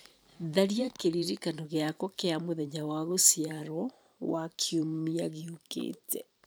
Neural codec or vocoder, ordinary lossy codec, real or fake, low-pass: vocoder, 44.1 kHz, 128 mel bands every 512 samples, BigVGAN v2; none; fake; none